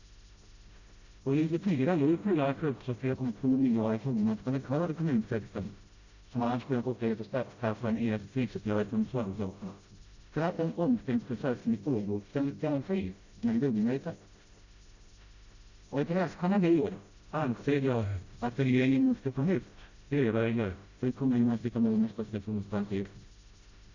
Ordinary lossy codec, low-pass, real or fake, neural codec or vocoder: Opus, 64 kbps; 7.2 kHz; fake; codec, 16 kHz, 0.5 kbps, FreqCodec, smaller model